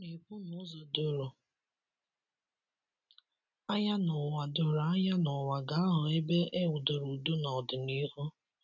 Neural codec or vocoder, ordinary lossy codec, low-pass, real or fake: none; none; 7.2 kHz; real